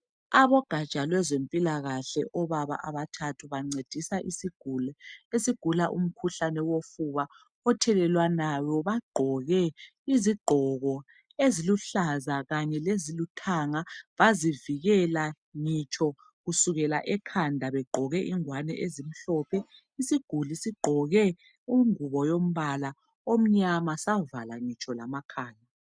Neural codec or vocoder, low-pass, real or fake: none; 9.9 kHz; real